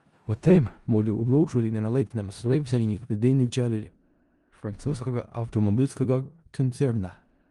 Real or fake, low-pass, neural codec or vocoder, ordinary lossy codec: fake; 10.8 kHz; codec, 16 kHz in and 24 kHz out, 0.4 kbps, LongCat-Audio-Codec, four codebook decoder; Opus, 32 kbps